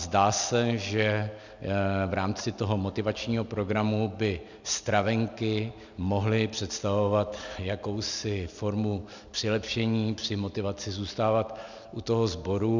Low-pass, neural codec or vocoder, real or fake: 7.2 kHz; none; real